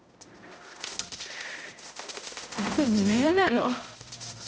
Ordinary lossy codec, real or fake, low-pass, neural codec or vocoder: none; fake; none; codec, 16 kHz, 0.5 kbps, X-Codec, HuBERT features, trained on balanced general audio